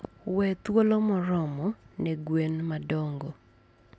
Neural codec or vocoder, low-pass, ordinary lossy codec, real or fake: none; none; none; real